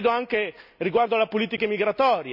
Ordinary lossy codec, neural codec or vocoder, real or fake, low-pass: none; none; real; 5.4 kHz